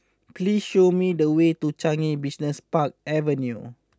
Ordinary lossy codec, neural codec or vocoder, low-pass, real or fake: none; none; none; real